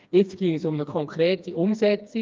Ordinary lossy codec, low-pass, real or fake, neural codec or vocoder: Opus, 24 kbps; 7.2 kHz; fake; codec, 16 kHz, 2 kbps, FreqCodec, smaller model